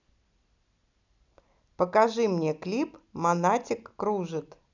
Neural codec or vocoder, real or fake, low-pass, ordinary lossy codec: none; real; 7.2 kHz; none